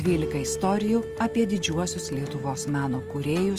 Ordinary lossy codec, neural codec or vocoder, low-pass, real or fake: Opus, 24 kbps; none; 14.4 kHz; real